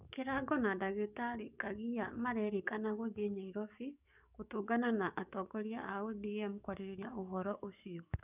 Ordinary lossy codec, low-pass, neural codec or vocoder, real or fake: none; 3.6 kHz; codec, 44.1 kHz, 7.8 kbps, DAC; fake